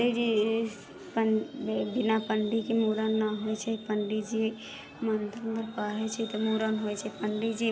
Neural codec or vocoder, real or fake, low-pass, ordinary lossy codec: none; real; none; none